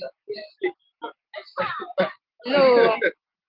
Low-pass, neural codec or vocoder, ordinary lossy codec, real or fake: 5.4 kHz; none; Opus, 16 kbps; real